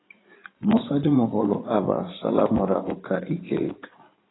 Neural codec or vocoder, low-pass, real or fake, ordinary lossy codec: codec, 16 kHz in and 24 kHz out, 2.2 kbps, FireRedTTS-2 codec; 7.2 kHz; fake; AAC, 16 kbps